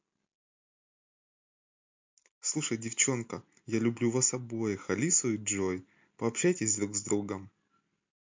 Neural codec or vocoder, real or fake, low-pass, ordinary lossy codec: none; real; 7.2 kHz; MP3, 48 kbps